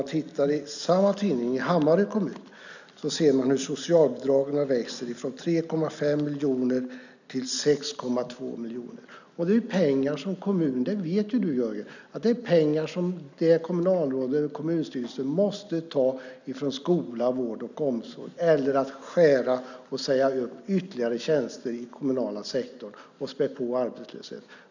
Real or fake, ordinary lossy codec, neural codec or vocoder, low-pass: real; none; none; 7.2 kHz